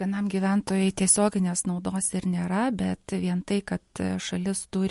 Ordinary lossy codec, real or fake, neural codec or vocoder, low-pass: MP3, 48 kbps; real; none; 14.4 kHz